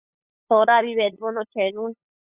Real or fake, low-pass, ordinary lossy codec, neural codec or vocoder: fake; 3.6 kHz; Opus, 64 kbps; codec, 16 kHz, 8 kbps, FunCodec, trained on LibriTTS, 25 frames a second